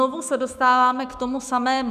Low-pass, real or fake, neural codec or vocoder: 14.4 kHz; fake; autoencoder, 48 kHz, 128 numbers a frame, DAC-VAE, trained on Japanese speech